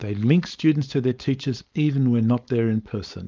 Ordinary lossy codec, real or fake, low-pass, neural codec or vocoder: Opus, 24 kbps; fake; 7.2 kHz; codec, 16 kHz, 4.8 kbps, FACodec